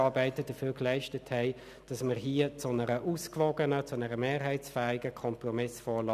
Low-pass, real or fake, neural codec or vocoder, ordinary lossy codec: 14.4 kHz; real; none; none